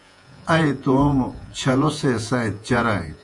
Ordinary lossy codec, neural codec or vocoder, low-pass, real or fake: Opus, 64 kbps; vocoder, 48 kHz, 128 mel bands, Vocos; 10.8 kHz; fake